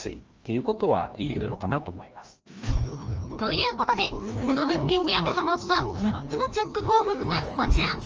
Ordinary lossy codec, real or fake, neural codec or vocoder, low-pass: Opus, 24 kbps; fake; codec, 16 kHz, 1 kbps, FreqCodec, larger model; 7.2 kHz